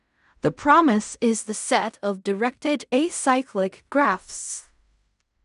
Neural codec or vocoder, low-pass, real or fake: codec, 16 kHz in and 24 kHz out, 0.4 kbps, LongCat-Audio-Codec, fine tuned four codebook decoder; 10.8 kHz; fake